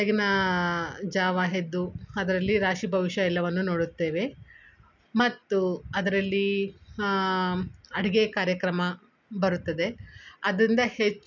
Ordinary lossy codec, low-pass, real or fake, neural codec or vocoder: none; 7.2 kHz; real; none